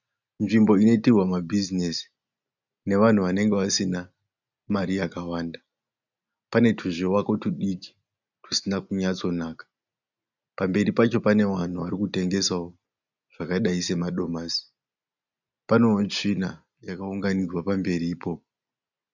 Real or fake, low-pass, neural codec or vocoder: real; 7.2 kHz; none